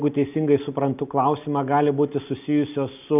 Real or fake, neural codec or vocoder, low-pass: real; none; 3.6 kHz